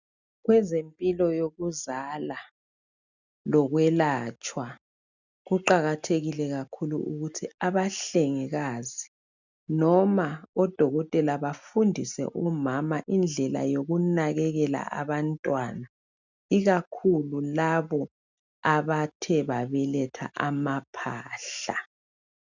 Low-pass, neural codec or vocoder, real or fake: 7.2 kHz; none; real